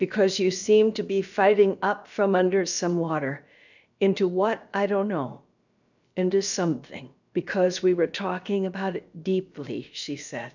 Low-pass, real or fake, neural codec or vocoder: 7.2 kHz; fake; codec, 16 kHz, about 1 kbps, DyCAST, with the encoder's durations